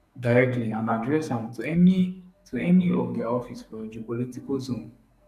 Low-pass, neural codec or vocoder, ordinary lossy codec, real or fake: 14.4 kHz; codec, 44.1 kHz, 2.6 kbps, SNAC; none; fake